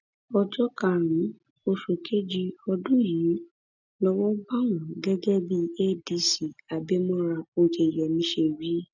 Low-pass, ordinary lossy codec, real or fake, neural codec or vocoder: 7.2 kHz; none; real; none